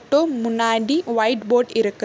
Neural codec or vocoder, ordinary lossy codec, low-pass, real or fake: none; none; none; real